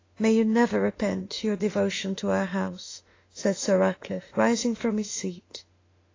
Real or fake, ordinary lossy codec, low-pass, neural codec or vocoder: fake; AAC, 32 kbps; 7.2 kHz; autoencoder, 48 kHz, 32 numbers a frame, DAC-VAE, trained on Japanese speech